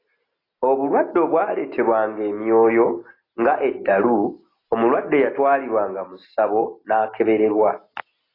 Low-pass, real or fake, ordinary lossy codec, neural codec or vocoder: 5.4 kHz; real; AAC, 24 kbps; none